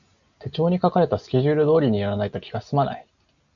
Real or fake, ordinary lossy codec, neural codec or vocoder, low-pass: real; MP3, 96 kbps; none; 7.2 kHz